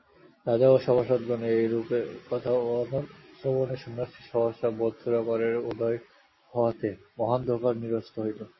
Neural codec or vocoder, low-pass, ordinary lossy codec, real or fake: none; 7.2 kHz; MP3, 24 kbps; real